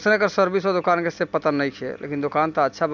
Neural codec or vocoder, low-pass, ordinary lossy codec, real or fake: none; 7.2 kHz; none; real